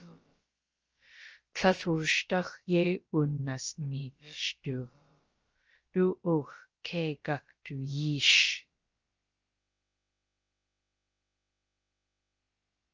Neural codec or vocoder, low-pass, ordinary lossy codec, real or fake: codec, 16 kHz, about 1 kbps, DyCAST, with the encoder's durations; 7.2 kHz; Opus, 24 kbps; fake